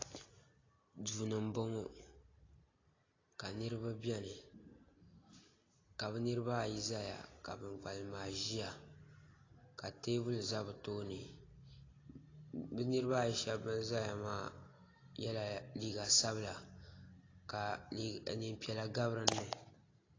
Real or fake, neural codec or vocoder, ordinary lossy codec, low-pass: real; none; AAC, 32 kbps; 7.2 kHz